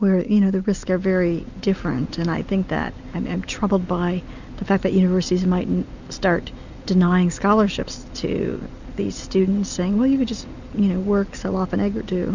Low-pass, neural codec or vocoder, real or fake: 7.2 kHz; none; real